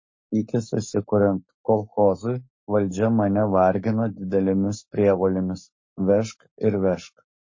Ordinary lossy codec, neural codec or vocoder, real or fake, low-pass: MP3, 32 kbps; codec, 44.1 kHz, 7.8 kbps, Pupu-Codec; fake; 7.2 kHz